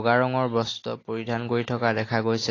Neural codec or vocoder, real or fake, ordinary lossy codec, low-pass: none; real; AAC, 32 kbps; 7.2 kHz